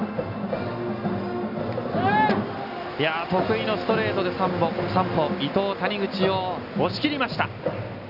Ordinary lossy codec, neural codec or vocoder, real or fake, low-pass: none; none; real; 5.4 kHz